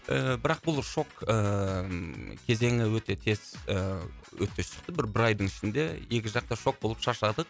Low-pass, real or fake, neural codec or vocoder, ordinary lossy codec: none; real; none; none